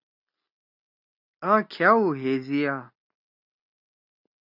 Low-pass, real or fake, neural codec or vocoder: 5.4 kHz; real; none